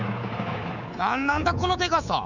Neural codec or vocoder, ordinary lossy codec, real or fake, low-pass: codec, 24 kHz, 3.1 kbps, DualCodec; none; fake; 7.2 kHz